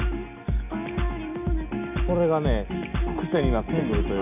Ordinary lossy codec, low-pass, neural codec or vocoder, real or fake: none; 3.6 kHz; none; real